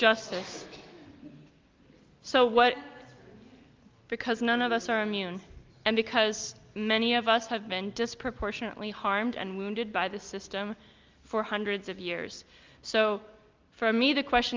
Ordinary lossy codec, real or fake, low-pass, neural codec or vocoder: Opus, 24 kbps; real; 7.2 kHz; none